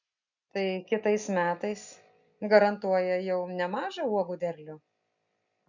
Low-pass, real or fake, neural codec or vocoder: 7.2 kHz; real; none